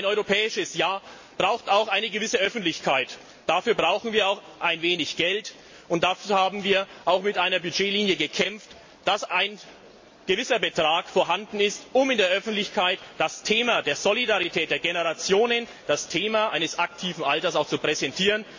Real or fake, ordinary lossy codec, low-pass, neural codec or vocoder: real; MP3, 32 kbps; 7.2 kHz; none